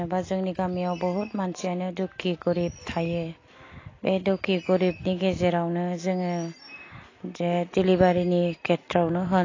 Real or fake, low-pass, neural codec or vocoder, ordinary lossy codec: real; 7.2 kHz; none; AAC, 32 kbps